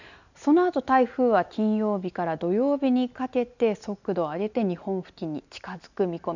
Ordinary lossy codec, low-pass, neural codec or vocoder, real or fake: none; 7.2 kHz; none; real